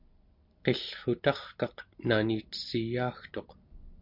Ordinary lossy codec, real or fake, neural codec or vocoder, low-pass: MP3, 48 kbps; real; none; 5.4 kHz